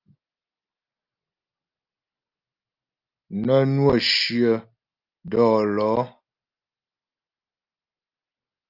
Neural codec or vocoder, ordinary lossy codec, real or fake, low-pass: none; Opus, 32 kbps; real; 5.4 kHz